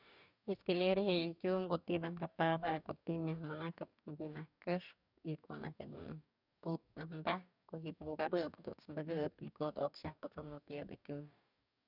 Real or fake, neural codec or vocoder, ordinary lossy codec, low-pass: fake; codec, 44.1 kHz, 2.6 kbps, DAC; none; 5.4 kHz